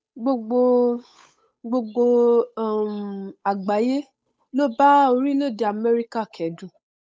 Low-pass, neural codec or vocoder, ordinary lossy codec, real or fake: none; codec, 16 kHz, 8 kbps, FunCodec, trained on Chinese and English, 25 frames a second; none; fake